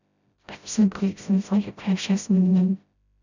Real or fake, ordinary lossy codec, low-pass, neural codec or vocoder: fake; none; 7.2 kHz; codec, 16 kHz, 0.5 kbps, FreqCodec, smaller model